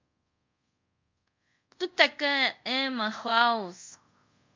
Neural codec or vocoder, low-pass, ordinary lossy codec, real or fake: codec, 24 kHz, 0.5 kbps, DualCodec; 7.2 kHz; MP3, 48 kbps; fake